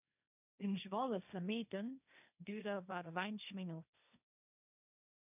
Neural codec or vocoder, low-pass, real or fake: codec, 16 kHz, 1.1 kbps, Voila-Tokenizer; 3.6 kHz; fake